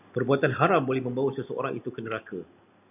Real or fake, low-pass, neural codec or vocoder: real; 3.6 kHz; none